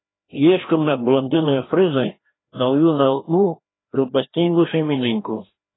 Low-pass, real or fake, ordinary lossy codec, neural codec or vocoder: 7.2 kHz; fake; AAC, 16 kbps; codec, 16 kHz, 1 kbps, FreqCodec, larger model